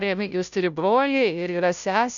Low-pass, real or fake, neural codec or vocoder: 7.2 kHz; fake; codec, 16 kHz, 0.5 kbps, FunCodec, trained on Chinese and English, 25 frames a second